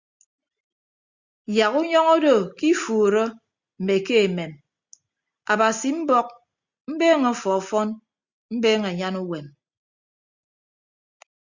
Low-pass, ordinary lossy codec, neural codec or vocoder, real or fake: 7.2 kHz; Opus, 64 kbps; none; real